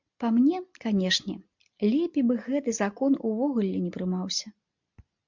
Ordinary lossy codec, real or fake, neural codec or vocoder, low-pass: MP3, 64 kbps; real; none; 7.2 kHz